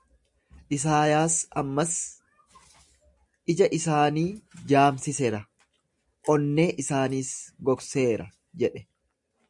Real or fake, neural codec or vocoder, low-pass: real; none; 10.8 kHz